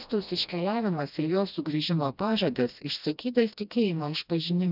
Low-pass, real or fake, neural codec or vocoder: 5.4 kHz; fake; codec, 16 kHz, 1 kbps, FreqCodec, smaller model